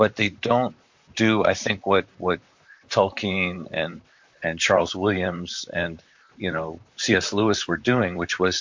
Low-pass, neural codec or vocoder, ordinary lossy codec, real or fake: 7.2 kHz; vocoder, 44.1 kHz, 128 mel bands, Pupu-Vocoder; MP3, 48 kbps; fake